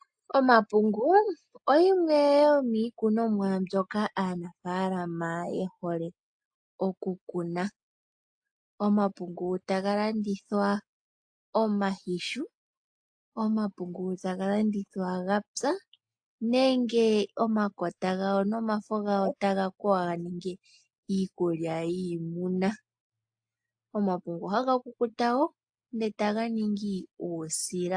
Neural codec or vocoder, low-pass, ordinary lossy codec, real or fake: none; 9.9 kHz; AAC, 64 kbps; real